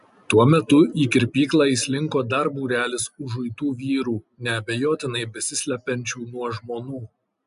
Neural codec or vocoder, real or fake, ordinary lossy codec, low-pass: none; real; AAC, 96 kbps; 10.8 kHz